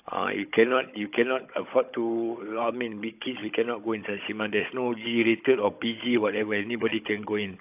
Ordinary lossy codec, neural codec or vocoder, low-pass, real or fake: AAC, 32 kbps; codec, 16 kHz, 16 kbps, FunCodec, trained on Chinese and English, 50 frames a second; 3.6 kHz; fake